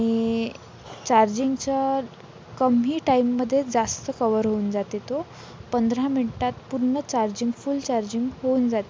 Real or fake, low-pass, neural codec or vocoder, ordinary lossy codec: real; none; none; none